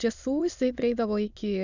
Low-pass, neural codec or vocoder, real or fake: 7.2 kHz; autoencoder, 22.05 kHz, a latent of 192 numbers a frame, VITS, trained on many speakers; fake